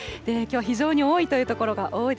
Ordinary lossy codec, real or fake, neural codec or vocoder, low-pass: none; real; none; none